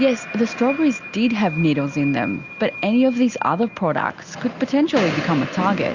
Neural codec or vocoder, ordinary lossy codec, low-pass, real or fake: none; Opus, 64 kbps; 7.2 kHz; real